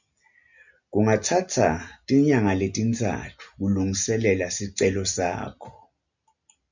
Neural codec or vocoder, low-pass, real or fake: none; 7.2 kHz; real